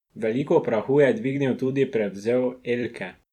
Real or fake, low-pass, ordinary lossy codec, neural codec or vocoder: fake; 19.8 kHz; none; vocoder, 44.1 kHz, 128 mel bands every 256 samples, BigVGAN v2